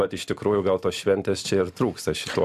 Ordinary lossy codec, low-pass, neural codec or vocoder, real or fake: MP3, 96 kbps; 14.4 kHz; none; real